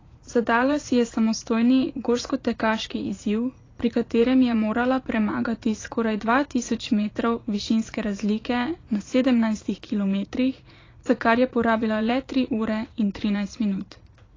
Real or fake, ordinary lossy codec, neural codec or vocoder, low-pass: fake; AAC, 32 kbps; vocoder, 44.1 kHz, 80 mel bands, Vocos; 7.2 kHz